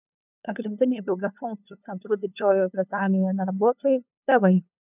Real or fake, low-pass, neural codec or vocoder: fake; 3.6 kHz; codec, 16 kHz, 2 kbps, FunCodec, trained on LibriTTS, 25 frames a second